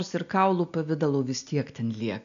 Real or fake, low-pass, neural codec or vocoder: real; 7.2 kHz; none